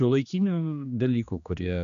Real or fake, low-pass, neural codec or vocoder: fake; 7.2 kHz; codec, 16 kHz, 2 kbps, X-Codec, HuBERT features, trained on general audio